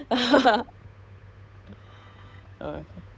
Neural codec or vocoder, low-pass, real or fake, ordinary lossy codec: codec, 16 kHz, 8 kbps, FunCodec, trained on Chinese and English, 25 frames a second; none; fake; none